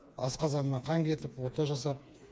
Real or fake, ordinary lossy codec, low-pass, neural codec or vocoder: fake; none; none; codec, 16 kHz, 4 kbps, FreqCodec, smaller model